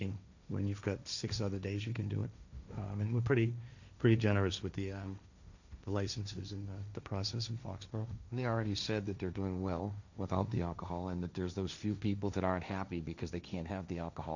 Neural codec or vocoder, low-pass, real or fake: codec, 16 kHz, 1.1 kbps, Voila-Tokenizer; 7.2 kHz; fake